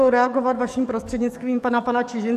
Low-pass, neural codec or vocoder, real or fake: 14.4 kHz; codec, 44.1 kHz, 7.8 kbps, DAC; fake